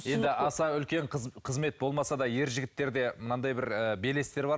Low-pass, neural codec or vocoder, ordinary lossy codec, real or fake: none; none; none; real